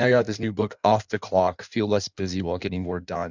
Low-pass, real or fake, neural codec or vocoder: 7.2 kHz; fake; codec, 16 kHz in and 24 kHz out, 1.1 kbps, FireRedTTS-2 codec